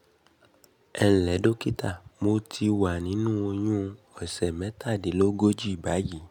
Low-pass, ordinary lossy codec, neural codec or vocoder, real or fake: 19.8 kHz; none; none; real